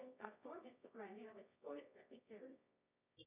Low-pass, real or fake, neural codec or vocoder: 3.6 kHz; fake; codec, 24 kHz, 0.9 kbps, WavTokenizer, medium music audio release